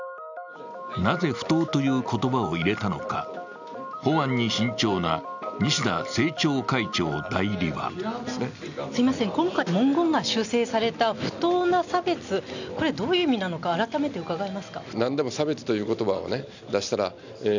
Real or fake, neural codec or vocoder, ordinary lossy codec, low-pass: real; none; none; 7.2 kHz